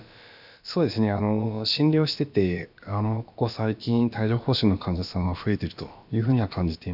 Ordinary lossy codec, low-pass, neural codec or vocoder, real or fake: none; 5.4 kHz; codec, 16 kHz, about 1 kbps, DyCAST, with the encoder's durations; fake